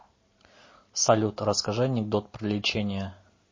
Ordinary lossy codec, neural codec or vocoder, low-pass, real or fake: MP3, 32 kbps; none; 7.2 kHz; real